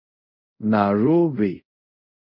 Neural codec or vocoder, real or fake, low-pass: codec, 24 kHz, 0.5 kbps, DualCodec; fake; 5.4 kHz